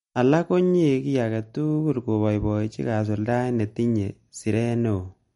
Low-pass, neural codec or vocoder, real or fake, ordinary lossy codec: 19.8 kHz; none; real; MP3, 48 kbps